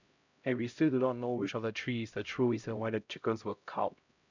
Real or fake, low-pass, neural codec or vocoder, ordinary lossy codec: fake; 7.2 kHz; codec, 16 kHz, 0.5 kbps, X-Codec, HuBERT features, trained on LibriSpeech; none